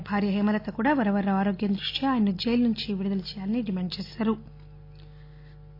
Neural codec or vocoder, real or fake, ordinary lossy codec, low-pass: none; real; AAC, 32 kbps; 5.4 kHz